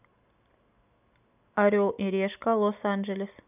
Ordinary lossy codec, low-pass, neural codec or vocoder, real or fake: none; 3.6 kHz; none; real